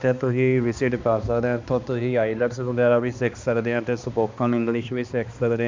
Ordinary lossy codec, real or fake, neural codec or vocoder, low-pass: MP3, 64 kbps; fake; codec, 16 kHz, 2 kbps, X-Codec, HuBERT features, trained on balanced general audio; 7.2 kHz